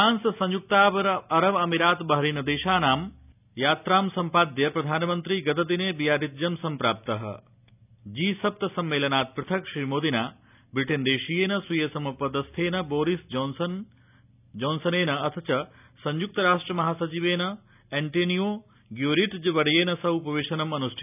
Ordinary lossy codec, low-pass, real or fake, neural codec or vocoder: none; 3.6 kHz; real; none